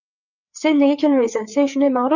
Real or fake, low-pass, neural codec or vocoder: fake; 7.2 kHz; codec, 16 kHz, 4 kbps, FreqCodec, larger model